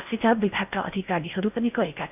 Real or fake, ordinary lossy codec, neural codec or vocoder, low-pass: fake; none; codec, 16 kHz in and 24 kHz out, 0.6 kbps, FocalCodec, streaming, 4096 codes; 3.6 kHz